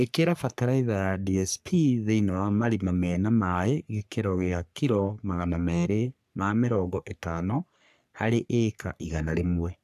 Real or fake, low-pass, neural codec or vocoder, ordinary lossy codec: fake; 14.4 kHz; codec, 44.1 kHz, 3.4 kbps, Pupu-Codec; none